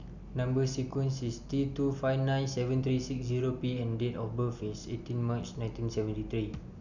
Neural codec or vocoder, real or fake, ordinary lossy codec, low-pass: none; real; none; 7.2 kHz